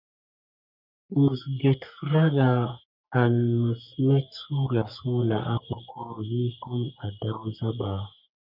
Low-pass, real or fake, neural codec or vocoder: 5.4 kHz; fake; codec, 44.1 kHz, 3.4 kbps, Pupu-Codec